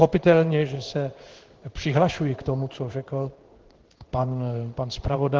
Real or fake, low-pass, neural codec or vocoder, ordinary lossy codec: fake; 7.2 kHz; codec, 16 kHz in and 24 kHz out, 1 kbps, XY-Tokenizer; Opus, 16 kbps